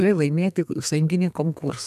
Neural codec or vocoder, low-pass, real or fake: codec, 32 kHz, 1.9 kbps, SNAC; 14.4 kHz; fake